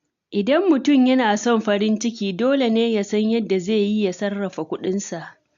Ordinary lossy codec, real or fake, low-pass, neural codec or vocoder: AAC, 64 kbps; real; 7.2 kHz; none